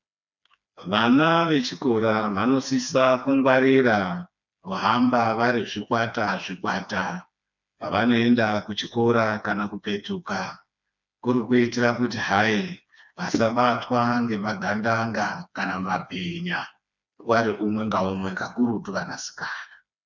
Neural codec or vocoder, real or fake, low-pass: codec, 16 kHz, 2 kbps, FreqCodec, smaller model; fake; 7.2 kHz